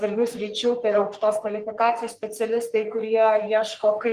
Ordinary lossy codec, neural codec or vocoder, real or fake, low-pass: Opus, 24 kbps; codec, 44.1 kHz, 3.4 kbps, Pupu-Codec; fake; 14.4 kHz